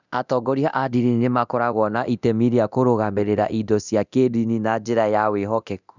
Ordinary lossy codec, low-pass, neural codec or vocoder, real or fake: none; 7.2 kHz; codec, 24 kHz, 0.9 kbps, DualCodec; fake